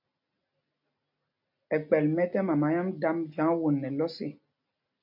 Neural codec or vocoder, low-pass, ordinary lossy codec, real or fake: none; 5.4 kHz; MP3, 48 kbps; real